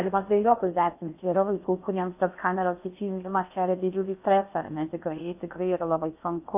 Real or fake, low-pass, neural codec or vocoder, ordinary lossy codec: fake; 3.6 kHz; codec, 16 kHz in and 24 kHz out, 0.6 kbps, FocalCodec, streaming, 4096 codes; AAC, 32 kbps